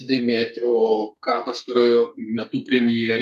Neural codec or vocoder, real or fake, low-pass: codec, 32 kHz, 1.9 kbps, SNAC; fake; 14.4 kHz